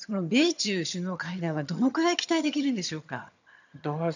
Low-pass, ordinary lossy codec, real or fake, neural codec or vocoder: 7.2 kHz; none; fake; vocoder, 22.05 kHz, 80 mel bands, HiFi-GAN